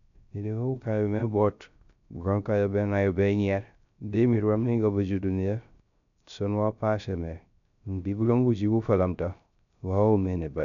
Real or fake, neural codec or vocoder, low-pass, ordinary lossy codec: fake; codec, 16 kHz, 0.3 kbps, FocalCodec; 7.2 kHz; none